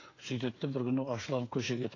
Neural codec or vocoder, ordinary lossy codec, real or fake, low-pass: codec, 16 kHz, 8 kbps, FreqCodec, smaller model; AAC, 32 kbps; fake; 7.2 kHz